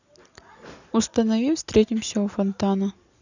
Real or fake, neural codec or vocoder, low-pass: real; none; 7.2 kHz